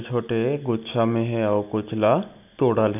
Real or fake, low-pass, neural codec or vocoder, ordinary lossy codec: real; 3.6 kHz; none; none